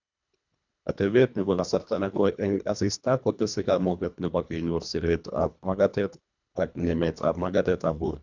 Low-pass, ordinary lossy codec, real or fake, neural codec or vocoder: 7.2 kHz; none; fake; codec, 24 kHz, 1.5 kbps, HILCodec